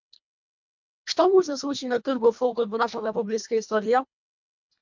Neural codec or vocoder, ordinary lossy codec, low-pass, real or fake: codec, 24 kHz, 1.5 kbps, HILCodec; MP3, 64 kbps; 7.2 kHz; fake